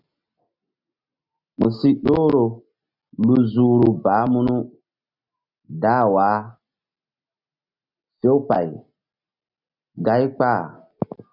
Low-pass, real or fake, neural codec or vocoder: 5.4 kHz; real; none